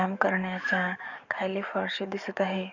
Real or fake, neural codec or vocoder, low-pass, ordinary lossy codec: fake; codec, 44.1 kHz, 7.8 kbps, DAC; 7.2 kHz; none